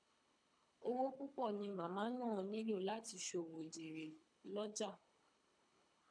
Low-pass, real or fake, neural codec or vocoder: 9.9 kHz; fake; codec, 24 kHz, 3 kbps, HILCodec